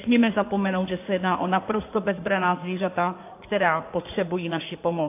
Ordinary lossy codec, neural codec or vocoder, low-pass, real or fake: AAC, 24 kbps; codec, 16 kHz in and 24 kHz out, 2.2 kbps, FireRedTTS-2 codec; 3.6 kHz; fake